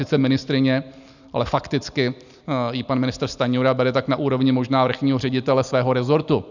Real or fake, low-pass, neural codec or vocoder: real; 7.2 kHz; none